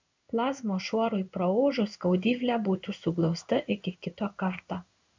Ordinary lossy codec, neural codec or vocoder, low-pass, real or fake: MP3, 64 kbps; codec, 16 kHz in and 24 kHz out, 1 kbps, XY-Tokenizer; 7.2 kHz; fake